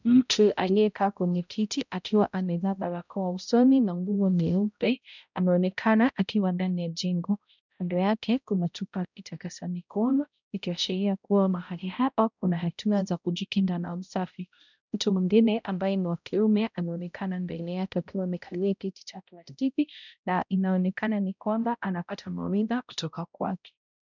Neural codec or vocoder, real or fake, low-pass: codec, 16 kHz, 0.5 kbps, X-Codec, HuBERT features, trained on balanced general audio; fake; 7.2 kHz